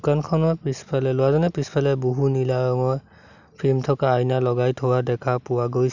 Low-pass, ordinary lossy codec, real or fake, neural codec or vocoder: 7.2 kHz; none; real; none